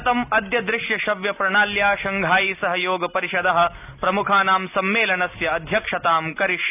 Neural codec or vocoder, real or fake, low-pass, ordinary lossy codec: none; real; 3.6 kHz; none